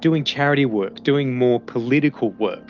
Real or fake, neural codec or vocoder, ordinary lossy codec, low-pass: real; none; Opus, 24 kbps; 7.2 kHz